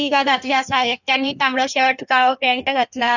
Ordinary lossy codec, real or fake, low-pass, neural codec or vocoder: none; fake; 7.2 kHz; codec, 16 kHz in and 24 kHz out, 1.1 kbps, FireRedTTS-2 codec